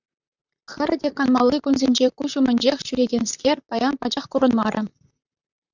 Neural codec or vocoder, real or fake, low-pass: vocoder, 44.1 kHz, 128 mel bands, Pupu-Vocoder; fake; 7.2 kHz